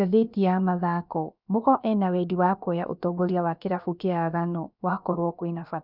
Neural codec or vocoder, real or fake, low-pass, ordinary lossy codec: codec, 16 kHz, about 1 kbps, DyCAST, with the encoder's durations; fake; 5.4 kHz; none